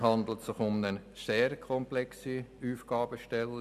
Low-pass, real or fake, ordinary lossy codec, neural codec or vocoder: 14.4 kHz; real; none; none